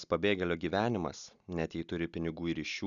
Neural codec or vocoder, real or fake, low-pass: none; real; 7.2 kHz